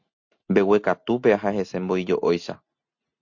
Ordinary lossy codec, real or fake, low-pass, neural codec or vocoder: MP3, 48 kbps; real; 7.2 kHz; none